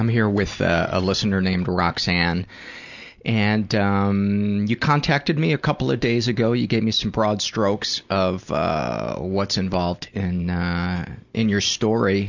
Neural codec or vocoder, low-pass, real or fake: none; 7.2 kHz; real